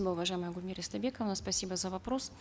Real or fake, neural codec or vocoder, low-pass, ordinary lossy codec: real; none; none; none